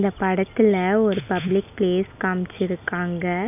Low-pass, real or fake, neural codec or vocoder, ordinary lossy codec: 3.6 kHz; real; none; none